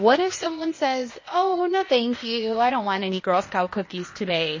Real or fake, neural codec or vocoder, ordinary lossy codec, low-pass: fake; codec, 16 kHz, 0.8 kbps, ZipCodec; MP3, 32 kbps; 7.2 kHz